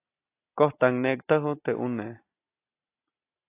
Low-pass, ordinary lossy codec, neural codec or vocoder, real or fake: 3.6 kHz; AAC, 24 kbps; none; real